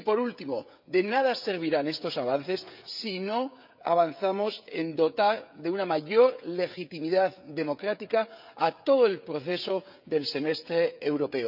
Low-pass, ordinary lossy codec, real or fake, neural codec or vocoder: 5.4 kHz; none; fake; codec, 16 kHz, 16 kbps, FreqCodec, smaller model